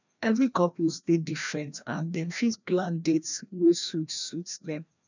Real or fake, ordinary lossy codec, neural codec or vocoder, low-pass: fake; none; codec, 16 kHz, 1 kbps, FreqCodec, larger model; 7.2 kHz